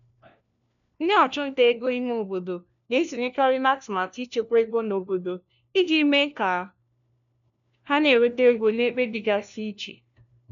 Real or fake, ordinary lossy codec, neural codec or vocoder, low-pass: fake; none; codec, 16 kHz, 1 kbps, FunCodec, trained on LibriTTS, 50 frames a second; 7.2 kHz